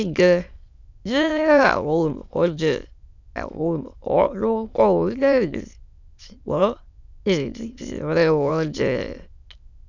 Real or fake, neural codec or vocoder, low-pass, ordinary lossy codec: fake; autoencoder, 22.05 kHz, a latent of 192 numbers a frame, VITS, trained on many speakers; 7.2 kHz; none